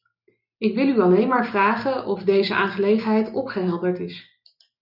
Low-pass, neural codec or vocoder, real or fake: 5.4 kHz; none; real